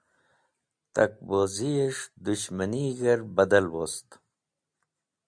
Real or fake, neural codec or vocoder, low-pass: real; none; 9.9 kHz